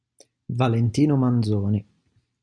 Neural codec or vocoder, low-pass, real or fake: none; 9.9 kHz; real